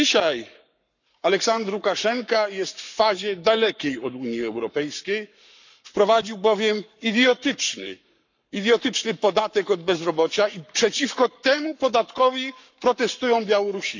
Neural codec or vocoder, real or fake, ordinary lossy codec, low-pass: codec, 44.1 kHz, 7.8 kbps, Pupu-Codec; fake; none; 7.2 kHz